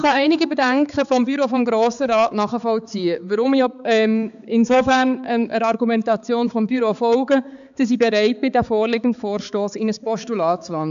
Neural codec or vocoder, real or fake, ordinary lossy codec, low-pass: codec, 16 kHz, 4 kbps, X-Codec, HuBERT features, trained on balanced general audio; fake; none; 7.2 kHz